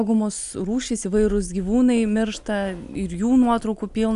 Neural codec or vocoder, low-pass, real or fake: none; 10.8 kHz; real